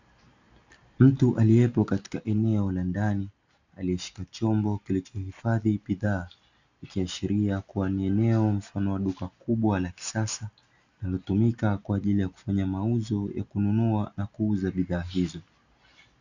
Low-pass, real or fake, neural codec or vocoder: 7.2 kHz; real; none